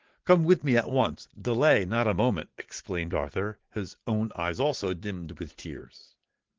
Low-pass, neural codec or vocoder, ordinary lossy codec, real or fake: 7.2 kHz; vocoder, 44.1 kHz, 80 mel bands, Vocos; Opus, 16 kbps; fake